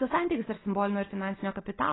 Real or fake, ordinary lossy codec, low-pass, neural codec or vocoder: real; AAC, 16 kbps; 7.2 kHz; none